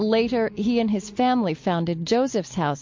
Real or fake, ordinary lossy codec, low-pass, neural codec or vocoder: real; MP3, 48 kbps; 7.2 kHz; none